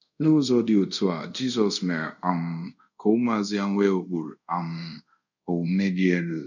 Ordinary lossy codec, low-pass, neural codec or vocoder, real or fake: none; 7.2 kHz; codec, 24 kHz, 0.5 kbps, DualCodec; fake